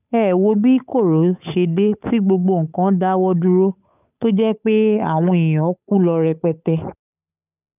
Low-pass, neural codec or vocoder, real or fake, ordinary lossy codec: 3.6 kHz; codec, 16 kHz, 8 kbps, FunCodec, trained on Chinese and English, 25 frames a second; fake; none